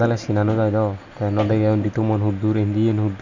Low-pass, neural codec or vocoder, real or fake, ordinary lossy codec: 7.2 kHz; none; real; none